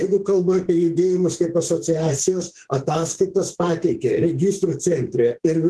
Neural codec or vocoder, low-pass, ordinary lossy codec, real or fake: autoencoder, 48 kHz, 32 numbers a frame, DAC-VAE, trained on Japanese speech; 10.8 kHz; Opus, 16 kbps; fake